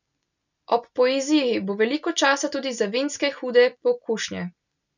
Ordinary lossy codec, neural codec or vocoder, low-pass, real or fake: none; none; 7.2 kHz; real